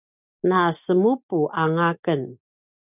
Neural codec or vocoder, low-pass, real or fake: none; 3.6 kHz; real